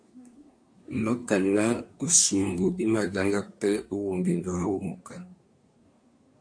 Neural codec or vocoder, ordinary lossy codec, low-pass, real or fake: codec, 24 kHz, 1 kbps, SNAC; MP3, 48 kbps; 9.9 kHz; fake